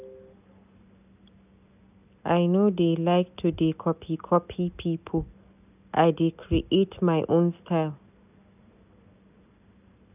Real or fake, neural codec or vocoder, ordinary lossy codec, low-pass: real; none; none; 3.6 kHz